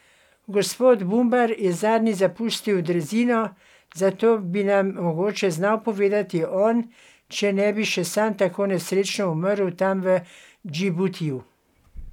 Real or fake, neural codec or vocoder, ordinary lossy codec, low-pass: real; none; none; 19.8 kHz